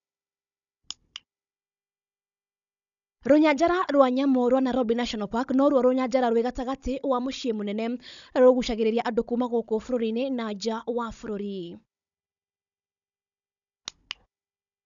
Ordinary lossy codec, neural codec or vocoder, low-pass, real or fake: none; codec, 16 kHz, 16 kbps, FunCodec, trained on Chinese and English, 50 frames a second; 7.2 kHz; fake